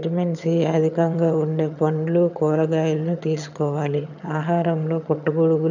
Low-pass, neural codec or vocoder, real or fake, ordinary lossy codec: 7.2 kHz; vocoder, 22.05 kHz, 80 mel bands, HiFi-GAN; fake; none